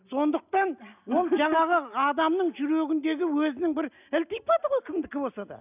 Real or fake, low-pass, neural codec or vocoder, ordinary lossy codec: real; 3.6 kHz; none; none